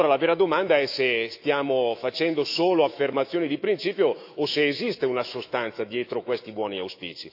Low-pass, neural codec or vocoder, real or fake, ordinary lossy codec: 5.4 kHz; autoencoder, 48 kHz, 128 numbers a frame, DAC-VAE, trained on Japanese speech; fake; AAC, 48 kbps